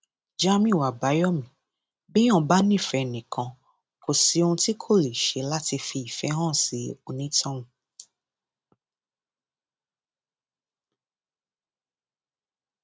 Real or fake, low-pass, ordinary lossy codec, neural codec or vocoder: real; none; none; none